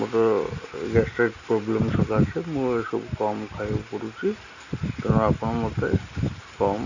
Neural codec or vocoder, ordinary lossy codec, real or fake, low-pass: none; none; real; 7.2 kHz